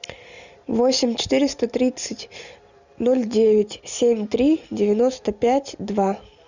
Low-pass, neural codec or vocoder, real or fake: 7.2 kHz; none; real